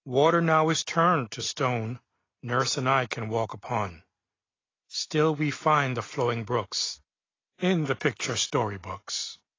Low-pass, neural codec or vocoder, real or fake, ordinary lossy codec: 7.2 kHz; none; real; AAC, 32 kbps